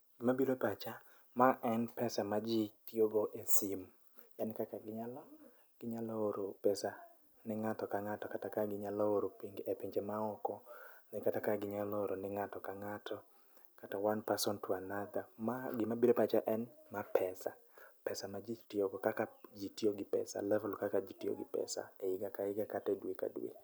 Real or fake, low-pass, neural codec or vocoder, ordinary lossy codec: real; none; none; none